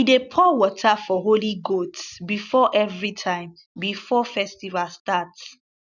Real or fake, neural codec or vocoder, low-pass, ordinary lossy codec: real; none; 7.2 kHz; none